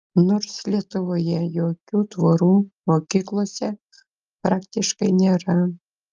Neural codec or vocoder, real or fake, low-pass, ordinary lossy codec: none; real; 7.2 kHz; Opus, 32 kbps